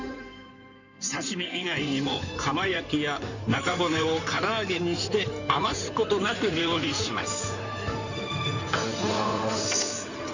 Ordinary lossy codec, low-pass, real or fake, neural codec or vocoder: none; 7.2 kHz; fake; codec, 16 kHz in and 24 kHz out, 2.2 kbps, FireRedTTS-2 codec